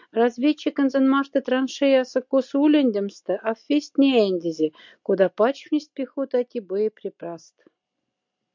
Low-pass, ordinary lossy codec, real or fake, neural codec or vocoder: 7.2 kHz; MP3, 64 kbps; real; none